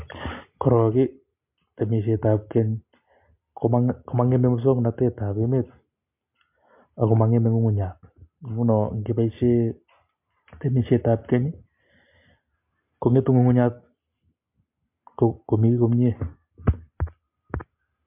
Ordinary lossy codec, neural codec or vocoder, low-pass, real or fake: MP3, 32 kbps; none; 3.6 kHz; real